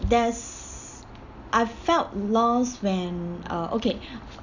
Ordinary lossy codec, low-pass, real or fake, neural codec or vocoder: none; 7.2 kHz; real; none